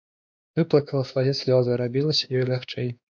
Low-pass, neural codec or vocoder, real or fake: 7.2 kHz; codec, 16 kHz, 2 kbps, X-Codec, WavLM features, trained on Multilingual LibriSpeech; fake